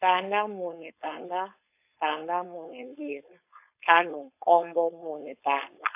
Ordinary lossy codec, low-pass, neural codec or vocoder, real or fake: AAC, 24 kbps; 3.6 kHz; codec, 16 kHz, 4.8 kbps, FACodec; fake